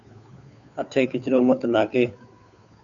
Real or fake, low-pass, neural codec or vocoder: fake; 7.2 kHz; codec, 16 kHz, 2 kbps, FunCodec, trained on Chinese and English, 25 frames a second